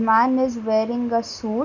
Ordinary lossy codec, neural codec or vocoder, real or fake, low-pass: none; none; real; 7.2 kHz